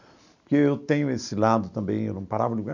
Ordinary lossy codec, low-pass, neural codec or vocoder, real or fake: none; 7.2 kHz; none; real